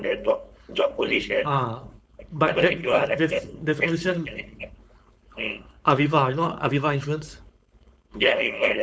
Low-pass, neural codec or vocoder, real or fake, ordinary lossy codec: none; codec, 16 kHz, 4.8 kbps, FACodec; fake; none